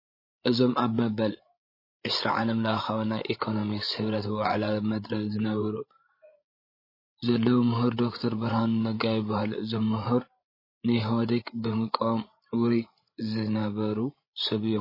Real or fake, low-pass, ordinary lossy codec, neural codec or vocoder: fake; 5.4 kHz; MP3, 24 kbps; codec, 16 kHz, 16 kbps, FreqCodec, larger model